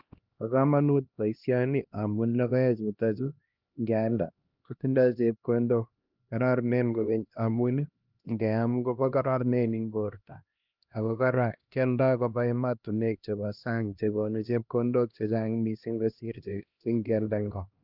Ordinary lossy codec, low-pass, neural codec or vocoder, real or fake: Opus, 32 kbps; 5.4 kHz; codec, 16 kHz, 1 kbps, X-Codec, HuBERT features, trained on LibriSpeech; fake